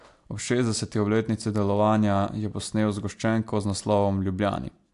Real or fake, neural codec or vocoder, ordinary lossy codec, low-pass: real; none; AAC, 64 kbps; 10.8 kHz